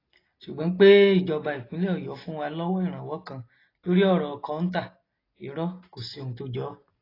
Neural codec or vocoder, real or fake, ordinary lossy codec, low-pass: none; real; AAC, 24 kbps; 5.4 kHz